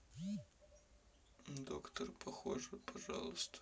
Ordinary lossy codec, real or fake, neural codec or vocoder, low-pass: none; real; none; none